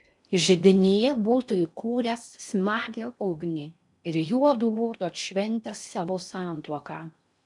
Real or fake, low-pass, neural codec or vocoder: fake; 10.8 kHz; codec, 16 kHz in and 24 kHz out, 0.6 kbps, FocalCodec, streaming, 4096 codes